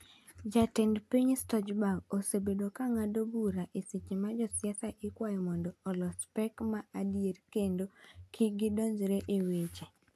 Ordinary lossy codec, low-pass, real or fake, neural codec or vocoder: AAC, 96 kbps; 14.4 kHz; real; none